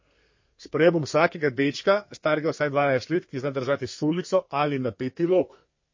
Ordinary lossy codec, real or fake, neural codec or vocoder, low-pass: MP3, 32 kbps; fake; codec, 32 kHz, 1.9 kbps, SNAC; 7.2 kHz